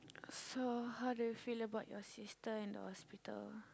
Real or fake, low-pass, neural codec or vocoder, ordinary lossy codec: real; none; none; none